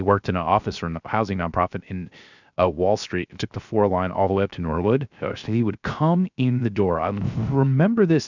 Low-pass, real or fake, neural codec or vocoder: 7.2 kHz; fake; codec, 16 kHz, 0.3 kbps, FocalCodec